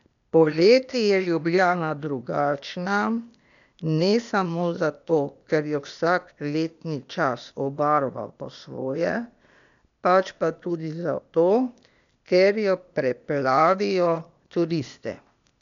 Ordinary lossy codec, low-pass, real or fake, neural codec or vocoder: none; 7.2 kHz; fake; codec, 16 kHz, 0.8 kbps, ZipCodec